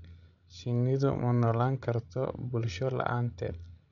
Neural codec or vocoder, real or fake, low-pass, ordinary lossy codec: codec, 16 kHz, 16 kbps, FreqCodec, larger model; fake; 7.2 kHz; none